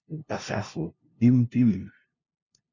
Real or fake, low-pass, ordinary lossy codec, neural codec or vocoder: fake; 7.2 kHz; MP3, 64 kbps; codec, 16 kHz, 0.5 kbps, FunCodec, trained on LibriTTS, 25 frames a second